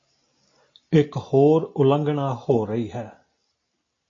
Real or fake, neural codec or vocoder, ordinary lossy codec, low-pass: real; none; AAC, 32 kbps; 7.2 kHz